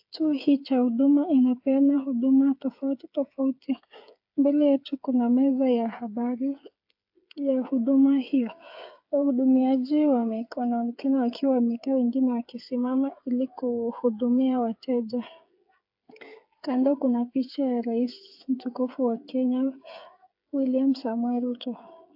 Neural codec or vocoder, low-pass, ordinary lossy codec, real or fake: codec, 16 kHz, 8 kbps, FreqCodec, smaller model; 5.4 kHz; AAC, 48 kbps; fake